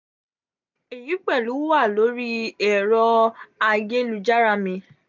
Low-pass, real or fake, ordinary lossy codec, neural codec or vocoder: 7.2 kHz; real; none; none